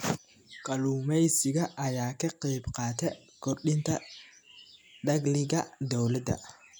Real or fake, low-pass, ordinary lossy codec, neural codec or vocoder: real; none; none; none